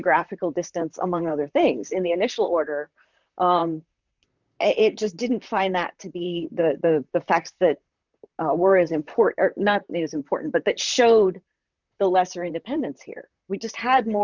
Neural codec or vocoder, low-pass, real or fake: vocoder, 44.1 kHz, 128 mel bands, Pupu-Vocoder; 7.2 kHz; fake